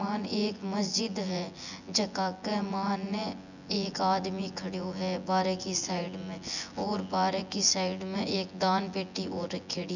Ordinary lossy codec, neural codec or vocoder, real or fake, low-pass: none; vocoder, 24 kHz, 100 mel bands, Vocos; fake; 7.2 kHz